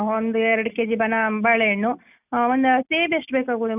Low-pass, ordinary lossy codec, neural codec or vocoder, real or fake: 3.6 kHz; none; none; real